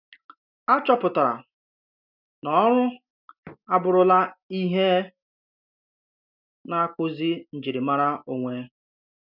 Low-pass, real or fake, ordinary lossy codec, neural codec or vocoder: 5.4 kHz; real; none; none